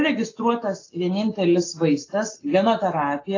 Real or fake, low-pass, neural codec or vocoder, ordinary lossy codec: real; 7.2 kHz; none; AAC, 32 kbps